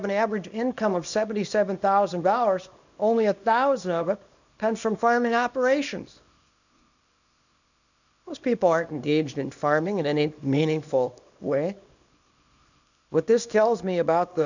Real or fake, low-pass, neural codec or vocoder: fake; 7.2 kHz; codec, 24 kHz, 0.9 kbps, WavTokenizer, small release